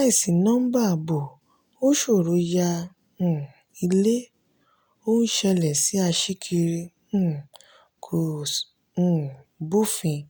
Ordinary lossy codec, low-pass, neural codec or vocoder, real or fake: none; none; none; real